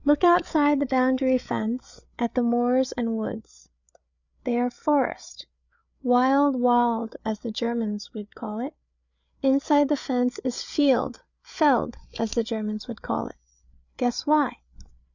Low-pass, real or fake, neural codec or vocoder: 7.2 kHz; fake; codec, 16 kHz, 8 kbps, FreqCodec, larger model